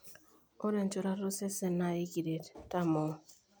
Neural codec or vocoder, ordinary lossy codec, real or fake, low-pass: none; none; real; none